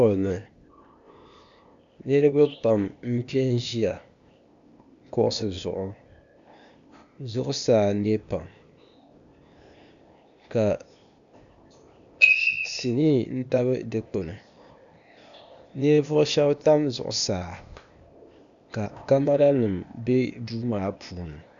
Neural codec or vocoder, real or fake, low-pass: codec, 16 kHz, 0.8 kbps, ZipCodec; fake; 7.2 kHz